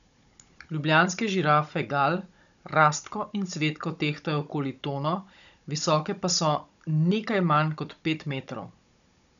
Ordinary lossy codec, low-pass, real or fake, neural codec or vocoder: none; 7.2 kHz; fake; codec, 16 kHz, 16 kbps, FunCodec, trained on Chinese and English, 50 frames a second